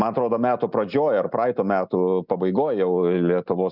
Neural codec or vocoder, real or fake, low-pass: none; real; 5.4 kHz